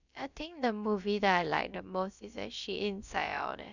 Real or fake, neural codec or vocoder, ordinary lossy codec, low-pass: fake; codec, 16 kHz, about 1 kbps, DyCAST, with the encoder's durations; none; 7.2 kHz